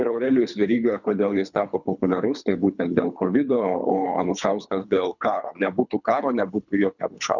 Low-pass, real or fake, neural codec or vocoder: 7.2 kHz; fake; codec, 24 kHz, 3 kbps, HILCodec